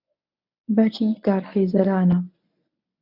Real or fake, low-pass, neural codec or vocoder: fake; 5.4 kHz; codec, 24 kHz, 0.9 kbps, WavTokenizer, medium speech release version 1